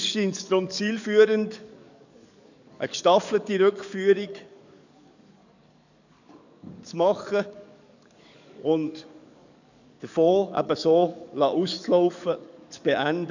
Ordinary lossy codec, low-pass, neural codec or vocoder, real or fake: none; 7.2 kHz; codec, 44.1 kHz, 7.8 kbps, DAC; fake